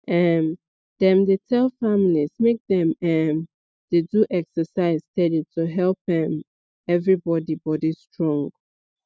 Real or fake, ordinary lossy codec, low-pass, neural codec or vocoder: real; none; none; none